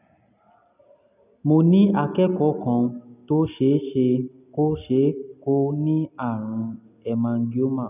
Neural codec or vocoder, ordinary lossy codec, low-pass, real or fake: none; none; 3.6 kHz; real